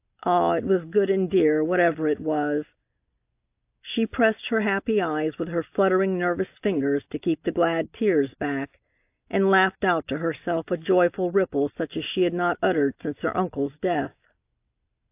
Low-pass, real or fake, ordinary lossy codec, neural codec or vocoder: 3.6 kHz; real; AAC, 32 kbps; none